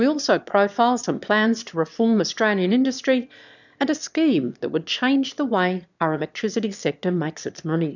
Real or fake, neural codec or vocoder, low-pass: fake; autoencoder, 22.05 kHz, a latent of 192 numbers a frame, VITS, trained on one speaker; 7.2 kHz